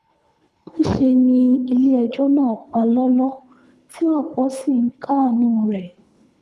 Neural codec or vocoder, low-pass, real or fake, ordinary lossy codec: codec, 24 kHz, 3 kbps, HILCodec; none; fake; none